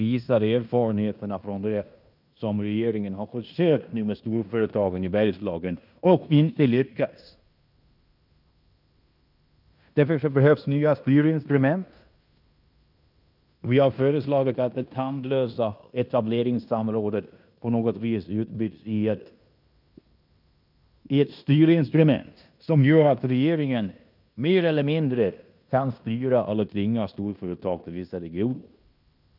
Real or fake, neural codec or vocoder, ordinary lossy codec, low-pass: fake; codec, 16 kHz in and 24 kHz out, 0.9 kbps, LongCat-Audio-Codec, fine tuned four codebook decoder; none; 5.4 kHz